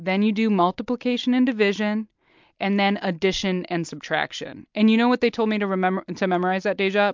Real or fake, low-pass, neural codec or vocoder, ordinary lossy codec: real; 7.2 kHz; none; MP3, 64 kbps